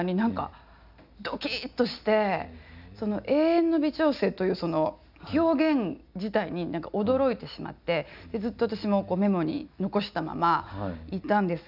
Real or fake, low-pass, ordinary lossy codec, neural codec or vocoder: real; 5.4 kHz; none; none